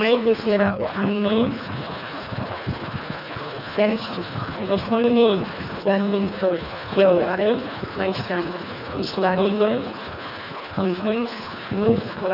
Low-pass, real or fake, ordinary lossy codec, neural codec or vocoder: 5.4 kHz; fake; none; codec, 24 kHz, 1.5 kbps, HILCodec